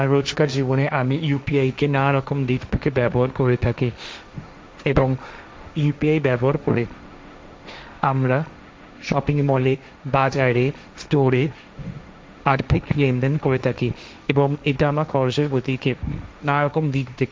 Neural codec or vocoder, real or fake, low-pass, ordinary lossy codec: codec, 16 kHz, 1.1 kbps, Voila-Tokenizer; fake; none; none